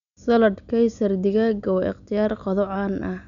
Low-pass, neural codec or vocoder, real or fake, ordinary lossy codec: 7.2 kHz; none; real; none